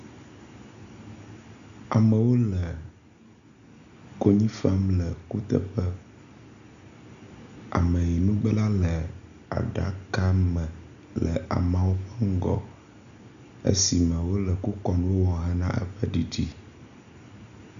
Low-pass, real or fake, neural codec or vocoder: 7.2 kHz; real; none